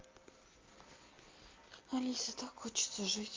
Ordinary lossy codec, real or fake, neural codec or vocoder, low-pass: Opus, 24 kbps; real; none; 7.2 kHz